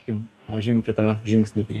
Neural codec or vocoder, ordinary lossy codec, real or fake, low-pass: codec, 44.1 kHz, 2.6 kbps, DAC; MP3, 96 kbps; fake; 14.4 kHz